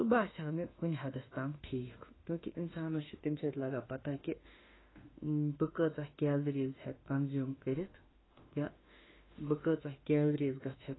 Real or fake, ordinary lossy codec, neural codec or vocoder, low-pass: fake; AAC, 16 kbps; autoencoder, 48 kHz, 32 numbers a frame, DAC-VAE, trained on Japanese speech; 7.2 kHz